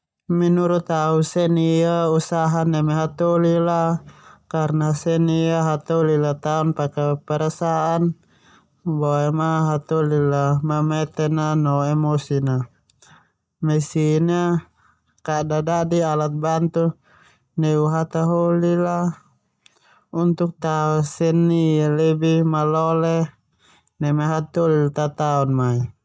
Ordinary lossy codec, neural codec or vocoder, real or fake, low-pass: none; none; real; none